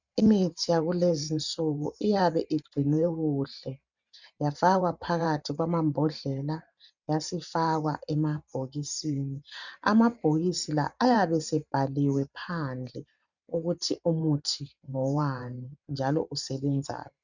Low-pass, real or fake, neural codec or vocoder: 7.2 kHz; fake; vocoder, 44.1 kHz, 128 mel bands every 512 samples, BigVGAN v2